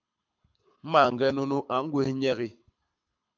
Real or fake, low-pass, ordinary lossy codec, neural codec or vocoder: fake; 7.2 kHz; MP3, 64 kbps; codec, 24 kHz, 6 kbps, HILCodec